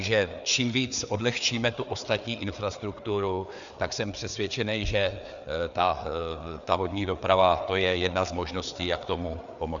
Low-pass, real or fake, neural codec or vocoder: 7.2 kHz; fake; codec, 16 kHz, 4 kbps, FreqCodec, larger model